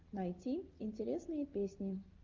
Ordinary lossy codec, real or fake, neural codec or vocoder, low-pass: Opus, 24 kbps; real; none; 7.2 kHz